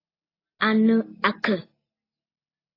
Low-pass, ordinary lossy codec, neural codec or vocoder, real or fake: 5.4 kHz; AAC, 24 kbps; none; real